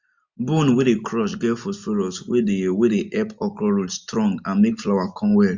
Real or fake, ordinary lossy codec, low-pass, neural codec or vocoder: real; MP3, 64 kbps; 7.2 kHz; none